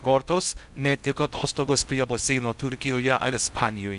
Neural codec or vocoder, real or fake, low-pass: codec, 16 kHz in and 24 kHz out, 0.6 kbps, FocalCodec, streaming, 2048 codes; fake; 10.8 kHz